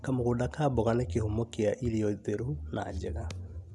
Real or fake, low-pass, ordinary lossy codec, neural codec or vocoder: real; none; none; none